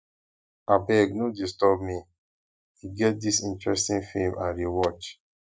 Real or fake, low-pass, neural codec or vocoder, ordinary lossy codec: real; none; none; none